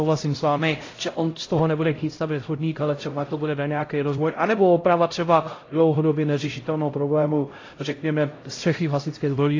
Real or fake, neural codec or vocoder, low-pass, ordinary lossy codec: fake; codec, 16 kHz, 0.5 kbps, X-Codec, HuBERT features, trained on LibriSpeech; 7.2 kHz; AAC, 32 kbps